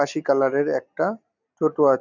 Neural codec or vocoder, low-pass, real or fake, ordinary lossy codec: none; 7.2 kHz; real; none